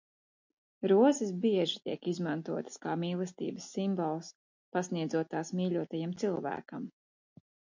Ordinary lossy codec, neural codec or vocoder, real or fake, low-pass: MP3, 48 kbps; none; real; 7.2 kHz